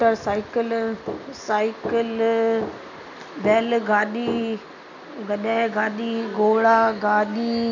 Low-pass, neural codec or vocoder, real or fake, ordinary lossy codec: 7.2 kHz; none; real; none